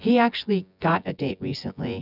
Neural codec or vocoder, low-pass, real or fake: vocoder, 24 kHz, 100 mel bands, Vocos; 5.4 kHz; fake